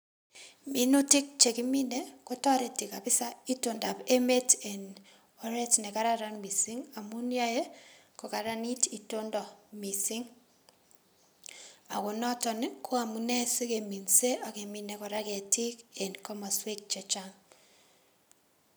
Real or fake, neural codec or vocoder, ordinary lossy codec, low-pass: real; none; none; none